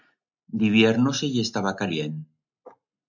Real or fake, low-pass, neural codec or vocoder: real; 7.2 kHz; none